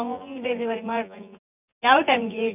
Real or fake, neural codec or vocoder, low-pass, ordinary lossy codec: fake; vocoder, 24 kHz, 100 mel bands, Vocos; 3.6 kHz; none